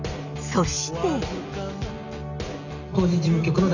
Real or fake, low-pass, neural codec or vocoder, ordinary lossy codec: real; 7.2 kHz; none; none